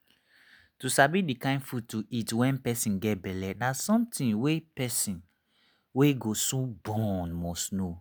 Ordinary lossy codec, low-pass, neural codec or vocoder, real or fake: none; none; none; real